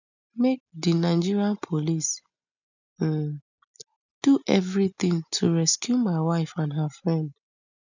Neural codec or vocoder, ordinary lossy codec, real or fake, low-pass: none; none; real; 7.2 kHz